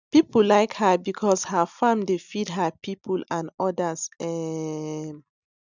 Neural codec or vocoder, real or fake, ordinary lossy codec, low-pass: none; real; none; 7.2 kHz